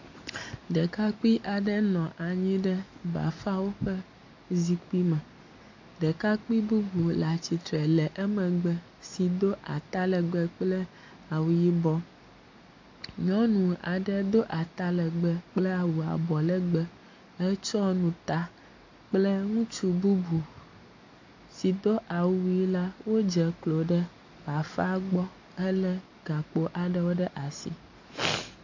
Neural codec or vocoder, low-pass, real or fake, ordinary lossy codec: none; 7.2 kHz; real; AAC, 48 kbps